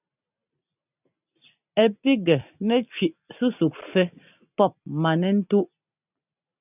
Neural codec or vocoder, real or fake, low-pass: vocoder, 44.1 kHz, 128 mel bands every 256 samples, BigVGAN v2; fake; 3.6 kHz